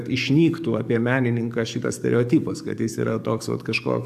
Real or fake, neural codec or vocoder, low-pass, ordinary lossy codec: fake; codec, 44.1 kHz, 7.8 kbps, DAC; 14.4 kHz; AAC, 96 kbps